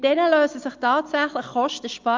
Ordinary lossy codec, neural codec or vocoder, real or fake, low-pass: Opus, 24 kbps; none; real; 7.2 kHz